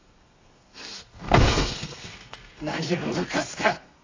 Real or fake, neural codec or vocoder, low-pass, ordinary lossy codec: fake; codec, 32 kHz, 1.9 kbps, SNAC; 7.2 kHz; AAC, 32 kbps